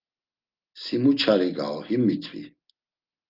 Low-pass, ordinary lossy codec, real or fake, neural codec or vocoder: 5.4 kHz; Opus, 32 kbps; real; none